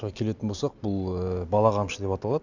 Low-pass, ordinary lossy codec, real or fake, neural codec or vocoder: 7.2 kHz; none; real; none